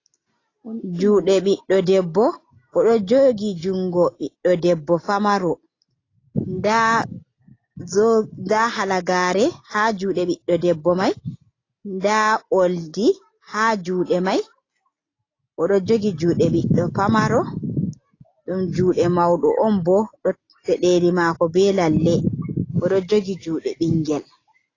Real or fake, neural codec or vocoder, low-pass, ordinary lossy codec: real; none; 7.2 kHz; AAC, 32 kbps